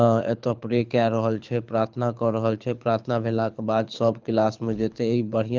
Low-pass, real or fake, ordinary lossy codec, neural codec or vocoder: 7.2 kHz; fake; Opus, 24 kbps; codec, 24 kHz, 6 kbps, HILCodec